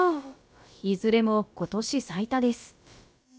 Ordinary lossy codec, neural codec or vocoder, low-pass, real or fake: none; codec, 16 kHz, about 1 kbps, DyCAST, with the encoder's durations; none; fake